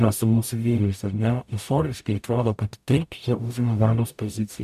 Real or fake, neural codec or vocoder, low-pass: fake; codec, 44.1 kHz, 0.9 kbps, DAC; 14.4 kHz